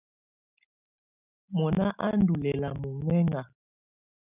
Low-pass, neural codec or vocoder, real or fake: 3.6 kHz; none; real